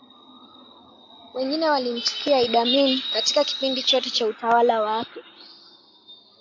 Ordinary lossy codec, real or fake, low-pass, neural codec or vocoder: AAC, 48 kbps; real; 7.2 kHz; none